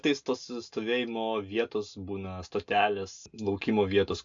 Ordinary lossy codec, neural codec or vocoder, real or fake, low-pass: AAC, 64 kbps; none; real; 7.2 kHz